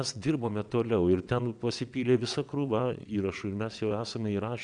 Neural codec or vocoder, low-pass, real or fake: vocoder, 22.05 kHz, 80 mel bands, WaveNeXt; 9.9 kHz; fake